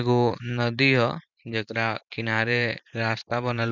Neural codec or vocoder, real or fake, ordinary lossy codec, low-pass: vocoder, 44.1 kHz, 128 mel bands every 512 samples, BigVGAN v2; fake; none; 7.2 kHz